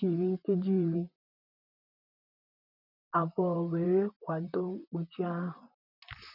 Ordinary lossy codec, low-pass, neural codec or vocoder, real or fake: none; 5.4 kHz; vocoder, 44.1 kHz, 128 mel bands, Pupu-Vocoder; fake